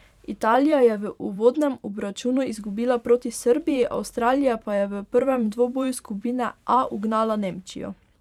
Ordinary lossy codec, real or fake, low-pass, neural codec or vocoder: none; fake; 19.8 kHz; vocoder, 44.1 kHz, 128 mel bands every 512 samples, BigVGAN v2